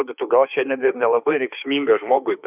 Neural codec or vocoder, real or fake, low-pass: autoencoder, 48 kHz, 32 numbers a frame, DAC-VAE, trained on Japanese speech; fake; 3.6 kHz